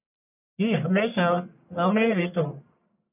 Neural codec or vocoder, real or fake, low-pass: codec, 44.1 kHz, 1.7 kbps, Pupu-Codec; fake; 3.6 kHz